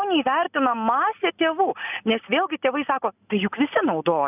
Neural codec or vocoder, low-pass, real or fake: none; 3.6 kHz; real